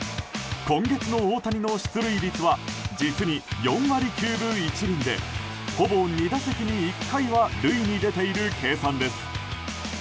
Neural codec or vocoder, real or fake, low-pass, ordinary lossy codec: none; real; none; none